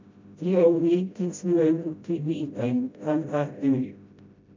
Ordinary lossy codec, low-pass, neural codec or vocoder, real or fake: MP3, 64 kbps; 7.2 kHz; codec, 16 kHz, 0.5 kbps, FreqCodec, smaller model; fake